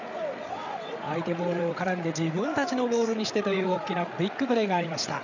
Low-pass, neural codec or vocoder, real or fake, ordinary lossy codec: 7.2 kHz; codec, 16 kHz, 8 kbps, FreqCodec, larger model; fake; none